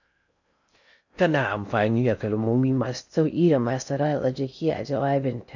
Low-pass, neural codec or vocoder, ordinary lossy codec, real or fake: 7.2 kHz; codec, 16 kHz in and 24 kHz out, 0.6 kbps, FocalCodec, streaming, 4096 codes; none; fake